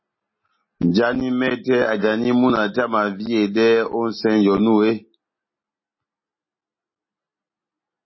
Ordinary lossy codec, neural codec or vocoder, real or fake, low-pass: MP3, 24 kbps; none; real; 7.2 kHz